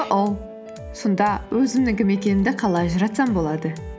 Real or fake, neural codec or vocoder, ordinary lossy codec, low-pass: real; none; none; none